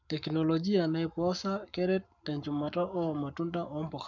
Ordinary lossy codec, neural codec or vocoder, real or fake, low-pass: none; codec, 44.1 kHz, 7.8 kbps, Pupu-Codec; fake; 7.2 kHz